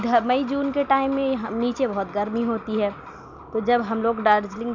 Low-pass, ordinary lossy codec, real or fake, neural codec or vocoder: 7.2 kHz; none; real; none